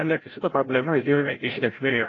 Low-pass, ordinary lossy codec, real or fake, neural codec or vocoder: 7.2 kHz; AAC, 32 kbps; fake; codec, 16 kHz, 0.5 kbps, FreqCodec, larger model